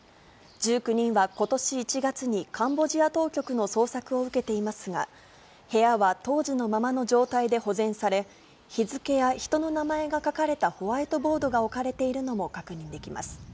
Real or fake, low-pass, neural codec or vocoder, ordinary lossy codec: real; none; none; none